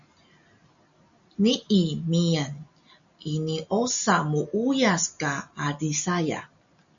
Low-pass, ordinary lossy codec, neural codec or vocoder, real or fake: 7.2 kHz; MP3, 96 kbps; none; real